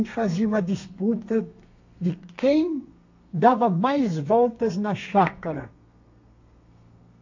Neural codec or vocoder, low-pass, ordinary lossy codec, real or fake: codec, 32 kHz, 1.9 kbps, SNAC; 7.2 kHz; AAC, 48 kbps; fake